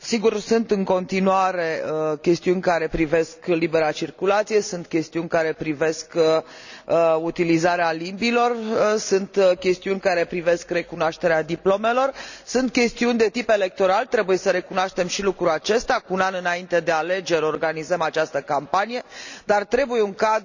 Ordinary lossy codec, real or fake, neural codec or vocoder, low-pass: none; real; none; 7.2 kHz